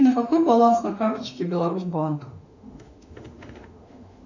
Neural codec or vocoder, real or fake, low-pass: autoencoder, 48 kHz, 32 numbers a frame, DAC-VAE, trained on Japanese speech; fake; 7.2 kHz